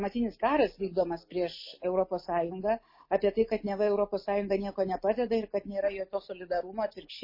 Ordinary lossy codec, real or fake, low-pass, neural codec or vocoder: MP3, 24 kbps; real; 5.4 kHz; none